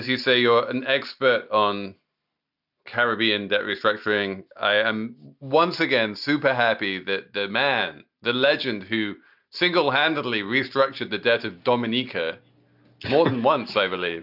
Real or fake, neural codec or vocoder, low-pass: real; none; 5.4 kHz